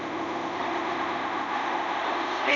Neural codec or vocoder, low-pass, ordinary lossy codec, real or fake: codec, 24 kHz, 0.5 kbps, DualCodec; 7.2 kHz; none; fake